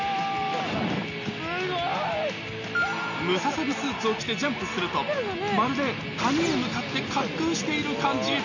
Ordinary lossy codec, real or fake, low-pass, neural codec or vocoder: none; real; 7.2 kHz; none